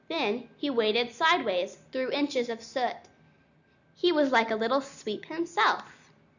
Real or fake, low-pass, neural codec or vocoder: real; 7.2 kHz; none